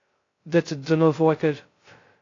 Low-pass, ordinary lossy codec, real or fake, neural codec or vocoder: 7.2 kHz; AAC, 32 kbps; fake; codec, 16 kHz, 0.2 kbps, FocalCodec